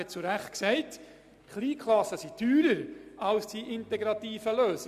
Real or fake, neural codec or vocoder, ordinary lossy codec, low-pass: real; none; MP3, 96 kbps; 14.4 kHz